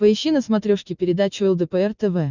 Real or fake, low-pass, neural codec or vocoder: real; 7.2 kHz; none